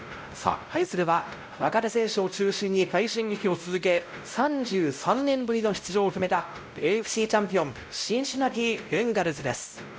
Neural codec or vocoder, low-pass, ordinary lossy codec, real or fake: codec, 16 kHz, 0.5 kbps, X-Codec, WavLM features, trained on Multilingual LibriSpeech; none; none; fake